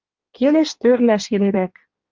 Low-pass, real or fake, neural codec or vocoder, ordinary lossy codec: 7.2 kHz; fake; codec, 16 kHz in and 24 kHz out, 1.1 kbps, FireRedTTS-2 codec; Opus, 24 kbps